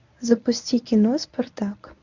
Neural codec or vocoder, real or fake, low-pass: codec, 24 kHz, 0.9 kbps, WavTokenizer, medium speech release version 1; fake; 7.2 kHz